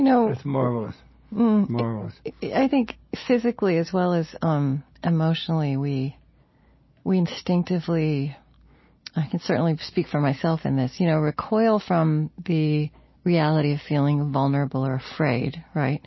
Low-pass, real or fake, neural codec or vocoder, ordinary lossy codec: 7.2 kHz; real; none; MP3, 24 kbps